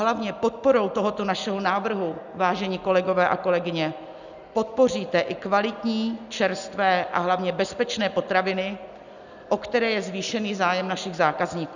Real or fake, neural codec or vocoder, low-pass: real; none; 7.2 kHz